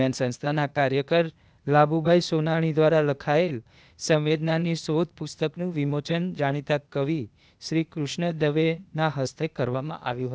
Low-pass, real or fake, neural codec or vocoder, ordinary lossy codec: none; fake; codec, 16 kHz, 0.8 kbps, ZipCodec; none